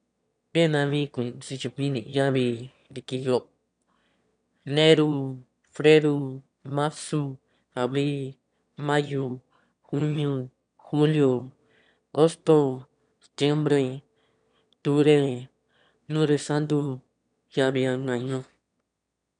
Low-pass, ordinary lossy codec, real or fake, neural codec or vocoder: 9.9 kHz; none; fake; autoencoder, 22.05 kHz, a latent of 192 numbers a frame, VITS, trained on one speaker